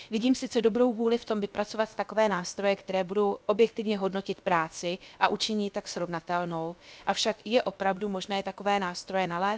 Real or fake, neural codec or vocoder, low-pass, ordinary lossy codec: fake; codec, 16 kHz, about 1 kbps, DyCAST, with the encoder's durations; none; none